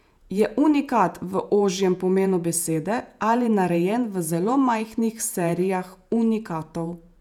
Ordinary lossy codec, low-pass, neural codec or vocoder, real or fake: none; 19.8 kHz; vocoder, 48 kHz, 128 mel bands, Vocos; fake